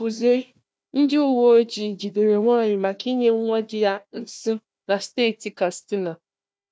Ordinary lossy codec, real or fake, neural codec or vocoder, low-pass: none; fake; codec, 16 kHz, 1 kbps, FunCodec, trained on Chinese and English, 50 frames a second; none